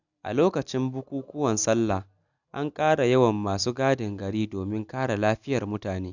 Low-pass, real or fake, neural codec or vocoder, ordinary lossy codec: 7.2 kHz; real; none; none